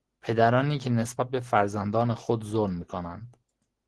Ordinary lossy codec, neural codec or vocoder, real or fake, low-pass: Opus, 16 kbps; none; real; 10.8 kHz